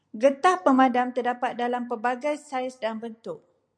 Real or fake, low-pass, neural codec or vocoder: real; 9.9 kHz; none